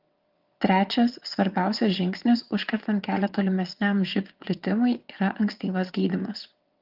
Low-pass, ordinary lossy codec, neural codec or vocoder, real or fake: 5.4 kHz; Opus, 32 kbps; vocoder, 22.05 kHz, 80 mel bands, WaveNeXt; fake